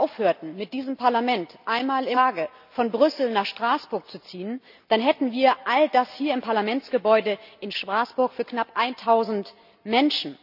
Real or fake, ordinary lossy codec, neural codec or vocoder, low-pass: real; none; none; 5.4 kHz